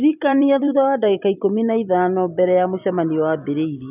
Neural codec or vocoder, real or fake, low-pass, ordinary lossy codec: vocoder, 44.1 kHz, 128 mel bands every 256 samples, BigVGAN v2; fake; 3.6 kHz; none